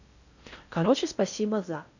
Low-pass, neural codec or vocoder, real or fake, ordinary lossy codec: 7.2 kHz; codec, 16 kHz in and 24 kHz out, 0.6 kbps, FocalCodec, streaming, 4096 codes; fake; none